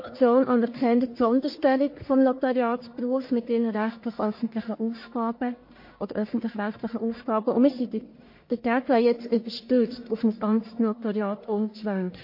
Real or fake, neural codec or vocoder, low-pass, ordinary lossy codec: fake; codec, 44.1 kHz, 1.7 kbps, Pupu-Codec; 5.4 kHz; MP3, 32 kbps